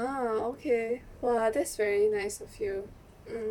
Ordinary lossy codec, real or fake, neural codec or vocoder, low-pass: MP3, 96 kbps; fake; vocoder, 44.1 kHz, 128 mel bands, Pupu-Vocoder; 19.8 kHz